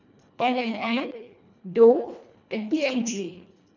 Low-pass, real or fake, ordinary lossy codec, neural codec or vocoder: 7.2 kHz; fake; none; codec, 24 kHz, 1.5 kbps, HILCodec